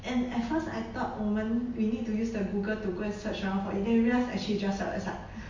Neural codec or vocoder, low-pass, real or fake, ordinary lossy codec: none; 7.2 kHz; real; MP3, 48 kbps